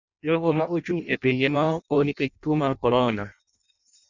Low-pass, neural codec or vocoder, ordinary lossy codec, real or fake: 7.2 kHz; codec, 16 kHz in and 24 kHz out, 0.6 kbps, FireRedTTS-2 codec; none; fake